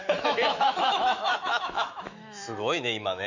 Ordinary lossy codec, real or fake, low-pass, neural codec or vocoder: none; fake; 7.2 kHz; autoencoder, 48 kHz, 128 numbers a frame, DAC-VAE, trained on Japanese speech